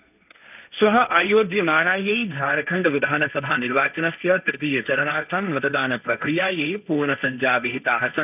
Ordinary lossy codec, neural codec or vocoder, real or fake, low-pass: none; codec, 16 kHz, 1.1 kbps, Voila-Tokenizer; fake; 3.6 kHz